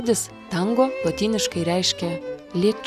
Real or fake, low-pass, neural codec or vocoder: real; 14.4 kHz; none